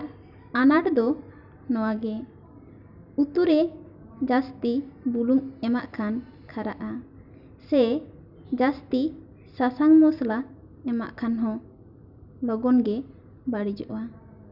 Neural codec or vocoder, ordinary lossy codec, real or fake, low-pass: none; none; real; 5.4 kHz